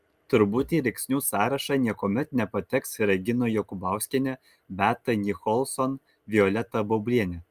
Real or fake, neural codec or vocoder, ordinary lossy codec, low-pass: real; none; Opus, 32 kbps; 14.4 kHz